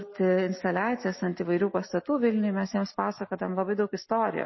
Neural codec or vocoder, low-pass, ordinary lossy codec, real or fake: none; 7.2 kHz; MP3, 24 kbps; real